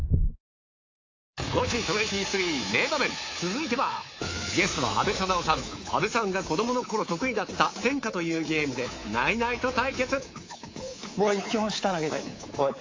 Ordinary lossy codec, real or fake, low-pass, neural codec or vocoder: AAC, 32 kbps; fake; 7.2 kHz; codec, 24 kHz, 3.1 kbps, DualCodec